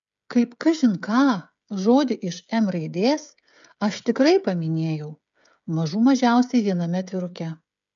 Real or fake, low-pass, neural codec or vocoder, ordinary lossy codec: fake; 7.2 kHz; codec, 16 kHz, 16 kbps, FreqCodec, smaller model; MP3, 64 kbps